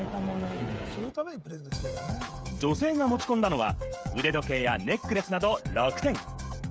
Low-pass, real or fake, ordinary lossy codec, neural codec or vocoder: none; fake; none; codec, 16 kHz, 16 kbps, FreqCodec, smaller model